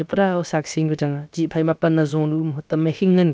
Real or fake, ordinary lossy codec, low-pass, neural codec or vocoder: fake; none; none; codec, 16 kHz, about 1 kbps, DyCAST, with the encoder's durations